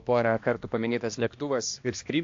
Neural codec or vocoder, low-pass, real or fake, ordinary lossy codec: codec, 16 kHz, 1 kbps, X-Codec, HuBERT features, trained on balanced general audio; 7.2 kHz; fake; AAC, 48 kbps